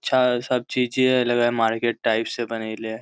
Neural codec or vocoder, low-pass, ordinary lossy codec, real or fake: none; none; none; real